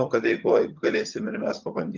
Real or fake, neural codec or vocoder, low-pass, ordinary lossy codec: fake; vocoder, 22.05 kHz, 80 mel bands, HiFi-GAN; 7.2 kHz; Opus, 32 kbps